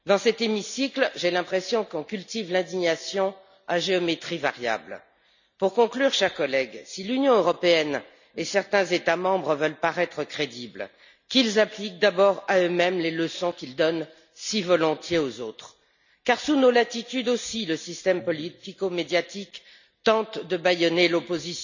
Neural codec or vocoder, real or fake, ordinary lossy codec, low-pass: none; real; none; 7.2 kHz